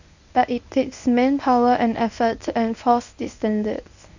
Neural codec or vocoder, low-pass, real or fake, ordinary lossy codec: codec, 24 kHz, 0.9 kbps, WavTokenizer, medium speech release version 1; 7.2 kHz; fake; none